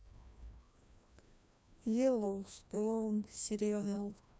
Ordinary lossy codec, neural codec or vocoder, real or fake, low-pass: none; codec, 16 kHz, 1 kbps, FreqCodec, larger model; fake; none